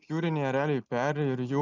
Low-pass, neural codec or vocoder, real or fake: 7.2 kHz; none; real